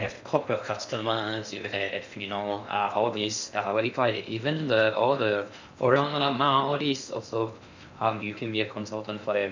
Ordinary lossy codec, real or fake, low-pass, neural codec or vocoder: MP3, 64 kbps; fake; 7.2 kHz; codec, 16 kHz in and 24 kHz out, 0.6 kbps, FocalCodec, streaming, 4096 codes